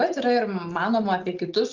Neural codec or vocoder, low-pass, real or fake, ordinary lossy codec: none; 7.2 kHz; real; Opus, 24 kbps